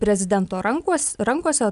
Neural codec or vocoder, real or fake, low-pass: none; real; 10.8 kHz